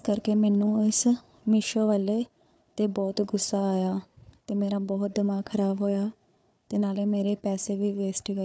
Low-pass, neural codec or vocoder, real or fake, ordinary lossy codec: none; codec, 16 kHz, 4 kbps, FunCodec, trained on Chinese and English, 50 frames a second; fake; none